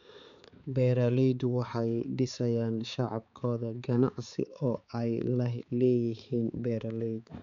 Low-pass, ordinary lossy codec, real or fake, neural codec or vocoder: 7.2 kHz; none; fake; codec, 16 kHz, 4 kbps, X-Codec, HuBERT features, trained on balanced general audio